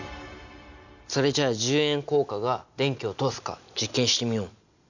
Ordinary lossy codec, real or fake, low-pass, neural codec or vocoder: none; real; 7.2 kHz; none